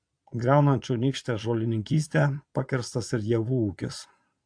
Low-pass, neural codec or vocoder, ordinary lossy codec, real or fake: 9.9 kHz; vocoder, 22.05 kHz, 80 mel bands, Vocos; AAC, 64 kbps; fake